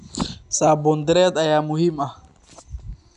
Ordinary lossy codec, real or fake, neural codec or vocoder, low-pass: none; real; none; 10.8 kHz